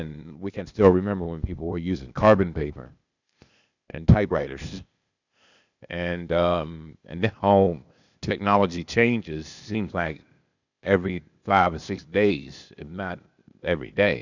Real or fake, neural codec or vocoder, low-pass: fake; codec, 16 kHz, 0.8 kbps, ZipCodec; 7.2 kHz